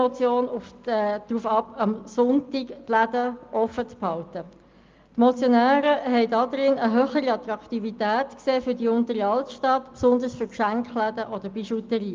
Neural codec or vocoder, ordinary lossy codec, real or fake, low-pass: none; Opus, 32 kbps; real; 7.2 kHz